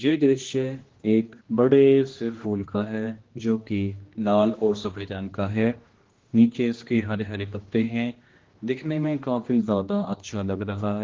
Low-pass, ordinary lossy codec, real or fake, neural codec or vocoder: 7.2 kHz; Opus, 16 kbps; fake; codec, 16 kHz, 1 kbps, X-Codec, HuBERT features, trained on general audio